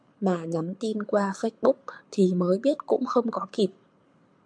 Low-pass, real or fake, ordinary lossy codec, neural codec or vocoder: 9.9 kHz; fake; MP3, 64 kbps; codec, 24 kHz, 6 kbps, HILCodec